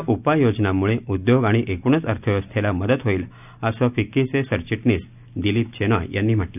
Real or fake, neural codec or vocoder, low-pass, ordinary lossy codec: real; none; 3.6 kHz; none